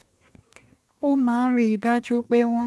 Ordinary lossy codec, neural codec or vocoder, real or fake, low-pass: none; codec, 24 kHz, 1 kbps, SNAC; fake; none